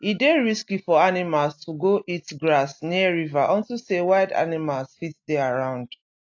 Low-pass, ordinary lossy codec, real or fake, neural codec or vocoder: 7.2 kHz; AAC, 48 kbps; real; none